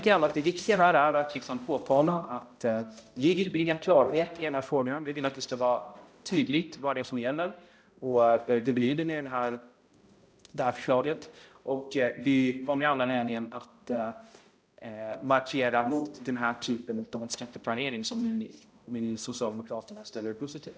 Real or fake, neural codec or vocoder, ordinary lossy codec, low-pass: fake; codec, 16 kHz, 0.5 kbps, X-Codec, HuBERT features, trained on balanced general audio; none; none